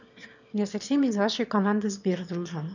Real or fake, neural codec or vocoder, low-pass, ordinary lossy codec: fake; autoencoder, 22.05 kHz, a latent of 192 numbers a frame, VITS, trained on one speaker; 7.2 kHz; none